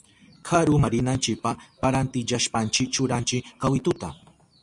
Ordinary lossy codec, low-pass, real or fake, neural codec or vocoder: MP3, 96 kbps; 10.8 kHz; real; none